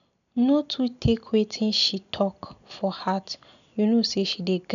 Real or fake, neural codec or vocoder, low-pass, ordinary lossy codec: real; none; 7.2 kHz; none